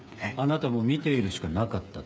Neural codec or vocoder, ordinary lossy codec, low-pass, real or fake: codec, 16 kHz, 8 kbps, FreqCodec, smaller model; none; none; fake